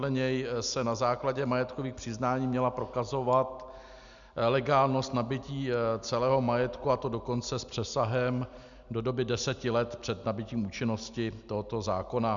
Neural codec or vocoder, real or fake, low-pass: none; real; 7.2 kHz